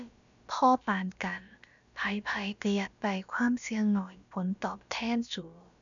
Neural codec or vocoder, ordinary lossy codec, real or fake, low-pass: codec, 16 kHz, about 1 kbps, DyCAST, with the encoder's durations; none; fake; 7.2 kHz